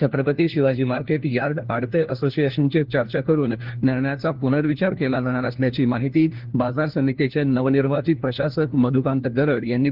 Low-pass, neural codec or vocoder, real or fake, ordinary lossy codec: 5.4 kHz; codec, 16 kHz, 1 kbps, FunCodec, trained on LibriTTS, 50 frames a second; fake; Opus, 16 kbps